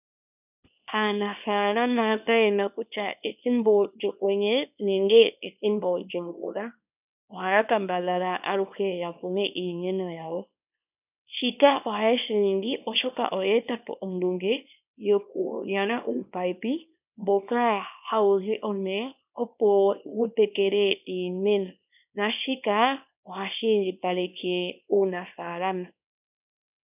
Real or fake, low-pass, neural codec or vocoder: fake; 3.6 kHz; codec, 24 kHz, 0.9 kbps, WavTokenizer, small release